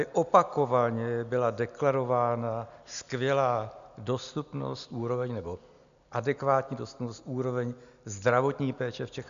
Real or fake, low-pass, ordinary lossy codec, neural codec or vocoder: real; 7.2 kHz; MP3, 96 kbps; none